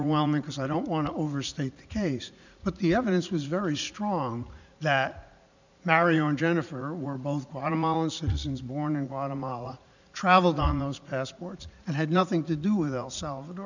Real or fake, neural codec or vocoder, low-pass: fake; vocoder, 44.1 kHz, 80 mel bands, Vocos; 7.2 kHz